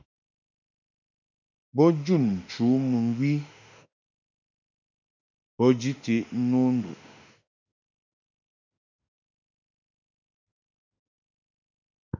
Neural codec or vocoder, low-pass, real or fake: autoencoder, 48 kHz, 32 numbers a frame, DAC-VAE, trained on Japanese speech; 7.2 kHz; fake